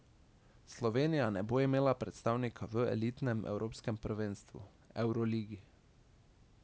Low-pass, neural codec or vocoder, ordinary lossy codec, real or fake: none; none; none; real